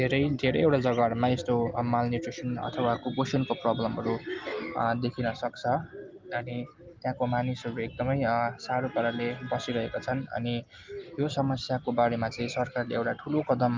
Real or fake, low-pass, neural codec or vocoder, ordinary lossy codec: real; 7.2 kHz; none; Opus, 32 kbps